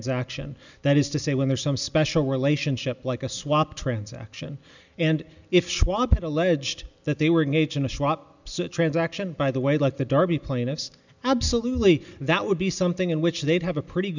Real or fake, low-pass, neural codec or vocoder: real; 7.2 kHz; none